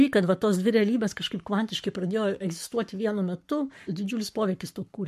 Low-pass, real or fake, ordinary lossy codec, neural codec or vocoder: 14.4 kHz; fake; MP3, 64 kbps; codec, 44.1 kHz, 7.8 kbps, Pupu-Codec